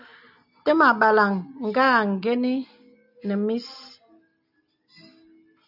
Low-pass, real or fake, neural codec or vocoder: 5.4 kHz; real; none